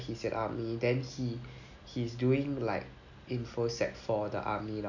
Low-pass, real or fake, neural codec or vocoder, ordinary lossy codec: 7.2 kHz; real; none; none